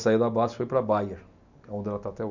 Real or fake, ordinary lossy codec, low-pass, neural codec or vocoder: real; none; 7.2 kHz; none